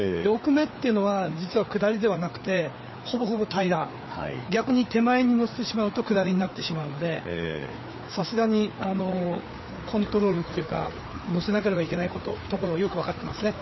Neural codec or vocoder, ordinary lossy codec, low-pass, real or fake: codec, 16 kHz, 4 kbps, FreqCodec, larger model; MP3, 24 kbps; 7.2 kHz; fake